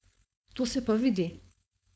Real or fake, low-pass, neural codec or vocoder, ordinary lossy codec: fake; none; codec, 16 kHz, 4.8 kbps, FACodec; none